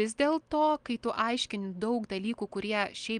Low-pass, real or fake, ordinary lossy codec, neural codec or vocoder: 9.9 kHz; real; Opus, 32 kbps; none